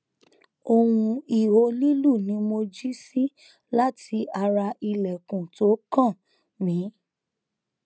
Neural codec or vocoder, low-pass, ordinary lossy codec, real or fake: none; none; none; real